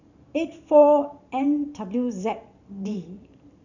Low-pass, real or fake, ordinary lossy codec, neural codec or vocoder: 7.2 kHz; fake; none; vocoder, 44.1 kHz, 128 mel bands every 512 samples, BigVGAN v2